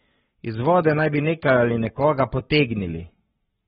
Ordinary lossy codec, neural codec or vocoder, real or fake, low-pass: AAC, 16 kbps; codec, 44.1 kHz, 7.8 kbps, Pupu-Codec; fake; 19.8 kHz